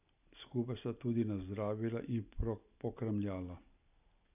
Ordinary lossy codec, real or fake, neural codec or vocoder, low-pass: none; real; none; 3.6 kHz